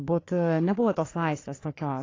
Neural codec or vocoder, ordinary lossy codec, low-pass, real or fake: codec, 44.1 kHz, 3.4 kbps, Pupu-Codec; AAC, 32 kbps; 7.2 kHz; fake